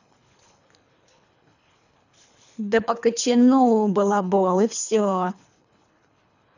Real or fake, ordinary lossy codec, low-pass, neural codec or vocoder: fake; none; 7.2 kHz; codec, 24 kHz, 3 kbps, HILCodec